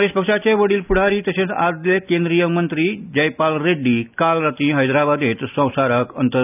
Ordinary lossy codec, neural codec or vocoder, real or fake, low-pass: none; none; real; 3.6 kHz